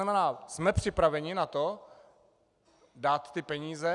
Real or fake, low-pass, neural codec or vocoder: real; 10.8 kHz; none